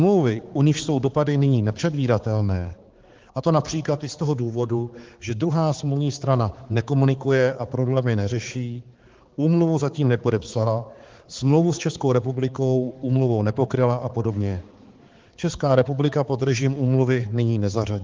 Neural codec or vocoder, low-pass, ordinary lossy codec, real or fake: codec, 16 kHz, 4 kbps, X-Codec, HuBERT features, trained on balanced general audio; 7.2 kHz; Opus, 16 kbps; fake